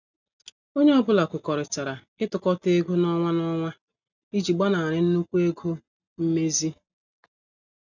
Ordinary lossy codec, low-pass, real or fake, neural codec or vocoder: none; 7.2 kHz; real; none